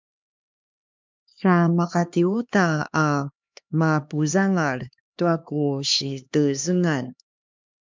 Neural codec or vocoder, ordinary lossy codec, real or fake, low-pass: codec, 16 kHz, 2 kbps, X-Codec, HuBERT features, trained on LibriSpeech; MP3, 64 kbps; fake; 7.2 kHz